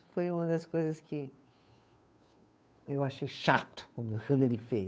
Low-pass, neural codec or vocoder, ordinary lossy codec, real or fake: none; codec, 16 kHz, 2 kbps, FunCodec, trained on Chinese and English, 25 frames a second; none; fake